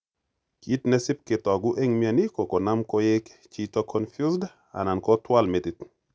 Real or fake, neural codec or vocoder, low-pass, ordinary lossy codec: real; none; none; none